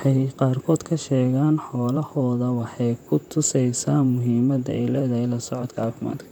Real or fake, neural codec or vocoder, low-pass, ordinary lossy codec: fake; vocoder, 44.1 kHz, 128 mel bands, Pupu-Vocoder; 19.8 kHz; none